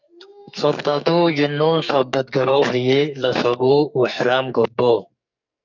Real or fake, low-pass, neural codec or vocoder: fake; 7.2 kHz; codec, 44.1 kHz, 2.6 kbps, SNAC